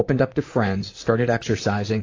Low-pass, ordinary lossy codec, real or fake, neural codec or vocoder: 7.2 kHz; AAC, 32 kbps; fake; vocoder, 44.1 kHz, 128 mel bands, Pupu-Vocoder